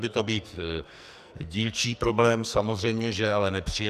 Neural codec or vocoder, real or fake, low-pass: codec, 44.1 kHz, 2.6 kbps, SNAC; fake; 14.4 kHz